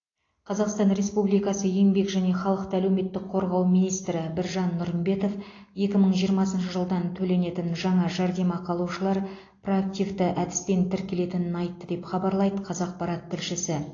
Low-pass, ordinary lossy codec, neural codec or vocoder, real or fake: 7.2 kHz; AAC, 32 kbps; none; real